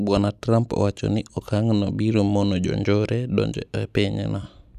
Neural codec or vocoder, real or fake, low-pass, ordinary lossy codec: none; real; 14.4 kHz; none